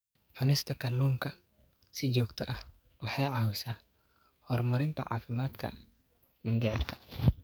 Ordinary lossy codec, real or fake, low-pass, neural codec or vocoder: none; fake; none; codec, 44.1 kHz, 2.6 kbps, SNAC